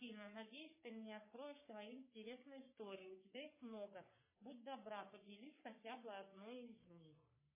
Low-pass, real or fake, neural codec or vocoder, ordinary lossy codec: 3.6 kHz; fake; codec, 44.1 kHz, 3.4 kbps, Pupu-Codec; MP3, 16 kbps